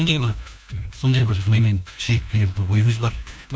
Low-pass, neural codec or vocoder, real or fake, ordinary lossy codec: none; codec, 16 kHz, 1 kbps, FunCodec, trained on LibriTTS, 50 frames a second; fake; none